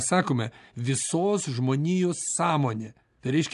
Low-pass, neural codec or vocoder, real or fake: 10.8 kHz; none; real